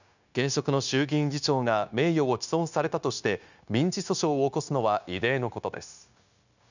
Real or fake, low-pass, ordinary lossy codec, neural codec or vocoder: fake; 7.2 kHz; none; codec, 16 kHz, 0.9 kbps, LongCat-Audio-Codec